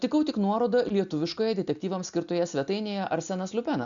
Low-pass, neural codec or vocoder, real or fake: 7.2 kHz; none; real